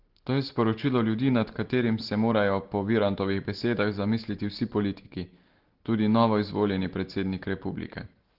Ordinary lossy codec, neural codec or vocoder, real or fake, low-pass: Opus, 16 kbps; none; real; 5.4 kHz